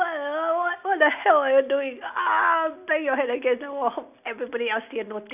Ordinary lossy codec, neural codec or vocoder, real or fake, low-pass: none; none; real; 3.6 kHz